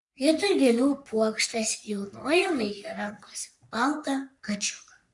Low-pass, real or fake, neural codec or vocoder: 10.8 kHz; fake; codec, 44.1 kHz, 3.4 kbps, Pupu-Codec